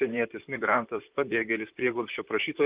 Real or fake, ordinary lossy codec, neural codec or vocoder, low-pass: fake; Opus, 32 kbps; vocoder, 44.1 kHz, 128 mel bands, Pupu-Vocoder; 3.6 kHz